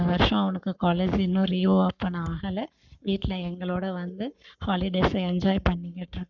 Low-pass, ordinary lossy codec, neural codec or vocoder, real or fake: 7.2 kHz; none; codec, 44.1 kHz, 7.8 kbps, Pupu-Codec; fake